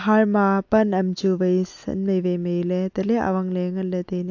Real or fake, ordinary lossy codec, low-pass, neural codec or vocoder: real; AAC, 48 kbps; 7.2 kHz; none